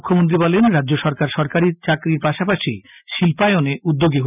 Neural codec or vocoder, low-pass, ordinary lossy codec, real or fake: none; 3.6 kHz; none; real